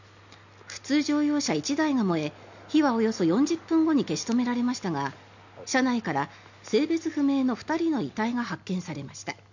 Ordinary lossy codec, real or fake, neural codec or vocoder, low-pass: none; real; none; 7.2 kHz